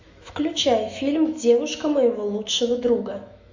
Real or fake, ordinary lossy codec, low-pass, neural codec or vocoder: fake; MP3, 64 kbps; 7.2 kHz; autoencoder, 48 kHz, 128 numbers a frame, DAC-VAE, trained on Japanese speech